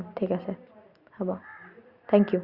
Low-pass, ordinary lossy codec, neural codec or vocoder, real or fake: 5.4 kHz; Opus, 64 kbps; none; real